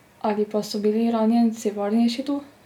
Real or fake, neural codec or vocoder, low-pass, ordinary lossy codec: real; none; 19.8 kHz; none